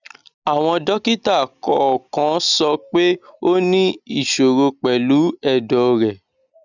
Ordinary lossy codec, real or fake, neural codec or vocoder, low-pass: none; real; none; 7.2 kHz